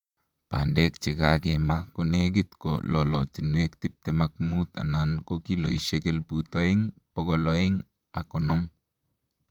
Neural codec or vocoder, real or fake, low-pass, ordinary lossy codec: vocoder, 44.1 kHz, 128 mel bands, Pupu-Vocoder; fake; 19.8 kHz; none